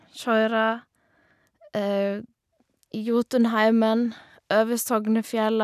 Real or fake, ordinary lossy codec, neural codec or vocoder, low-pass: real; none; none; 14.4 kHz